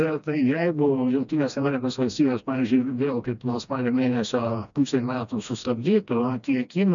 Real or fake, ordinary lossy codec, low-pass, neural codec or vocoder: fake; MP3, 96 kbps; 7.2 kHz; codec, 16 kHz, 1 kbps, FreqCodec, smaller model